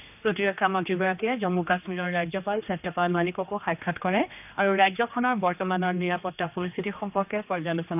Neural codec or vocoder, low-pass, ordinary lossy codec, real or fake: codec, 16 kHz, 2 kbps, X-Codec, HuBERT features, trained on general audio; 3.6 kHz; none; fake